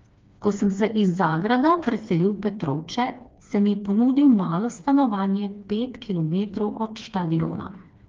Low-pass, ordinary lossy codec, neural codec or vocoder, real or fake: 7.2 kHz; Opus, 32 kbps; codec, 16 kHz, 2 kbps, FreqCodec, smaller model; fake